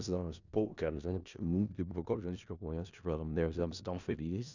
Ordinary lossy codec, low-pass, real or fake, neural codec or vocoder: none; 7.2 kHz; fake; codec, 16 kHz in and 24 kHz out, 0.4 kbps, LongCat-Audio-Codec, four codebook decoder